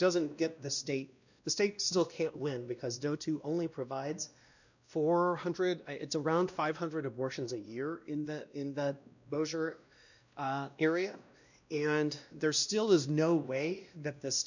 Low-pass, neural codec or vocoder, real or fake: 7.2 kHz; codec, 16 kHz, 1 kbps, X-Codec, WavLM features, trained on Multilingual LibriSpeech; fake